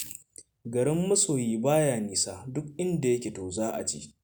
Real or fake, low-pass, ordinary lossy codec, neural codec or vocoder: real; none; none; none